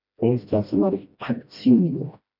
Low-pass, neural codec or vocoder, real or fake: 5.4 kHz; codec, 16 kHz, 1 kbps, FreqCodec, smaller model; fake